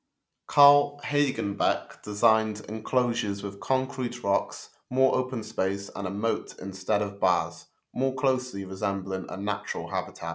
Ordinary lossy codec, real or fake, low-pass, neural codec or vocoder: none; real; none; none